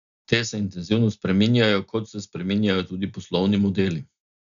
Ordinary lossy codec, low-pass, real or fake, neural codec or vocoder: none; 7.2 kHz; real; none